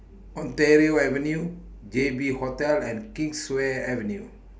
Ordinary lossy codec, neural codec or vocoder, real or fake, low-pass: none; none; real; none